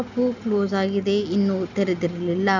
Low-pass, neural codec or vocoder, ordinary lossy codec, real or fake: 7.2 kHz; none; none; real